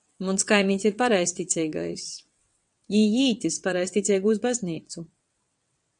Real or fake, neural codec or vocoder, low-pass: fake; vocoder, 22.05 kHz, 80 mel bands, WaveNeXt; 9.9 kHz